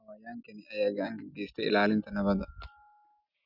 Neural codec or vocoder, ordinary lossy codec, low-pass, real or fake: none; none; 5.4 kHz; real